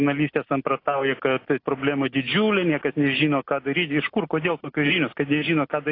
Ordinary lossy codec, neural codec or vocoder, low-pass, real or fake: AAC, 32 kbps; none; 5.4 kHz; real